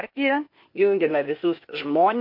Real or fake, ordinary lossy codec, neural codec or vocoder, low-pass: fake; MP3, 32 kbps; codec, 16 kHz, 0.8 kbps, ZipCodec; 5.4 kHz